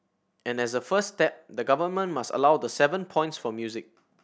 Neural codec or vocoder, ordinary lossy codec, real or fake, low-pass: none; none; real; none